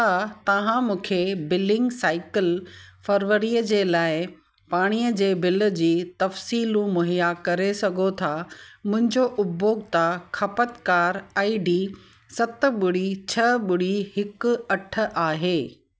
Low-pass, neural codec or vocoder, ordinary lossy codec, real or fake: none; none; none; real